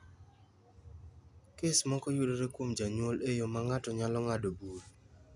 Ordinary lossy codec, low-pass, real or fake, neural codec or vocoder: none; 10.8 kHz; real; none